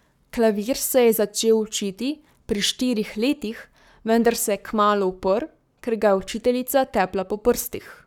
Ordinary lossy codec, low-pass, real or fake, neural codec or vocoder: none; 19.8 kHz; fake; codec, 44.1 kHz, 7.8 kbps, Pupu-Codec